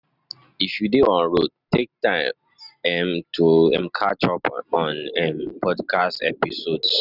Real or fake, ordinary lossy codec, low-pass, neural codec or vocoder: real; none; 5.4 kHz; none